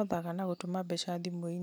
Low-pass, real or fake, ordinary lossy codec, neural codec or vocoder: none; real; none; none